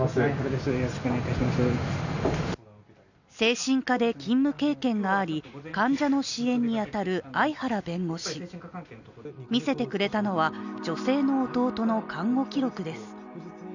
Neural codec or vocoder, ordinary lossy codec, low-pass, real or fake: none; none; 7.2 kHz; real